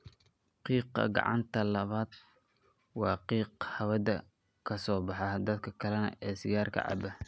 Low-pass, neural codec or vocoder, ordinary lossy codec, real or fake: none; none; none; real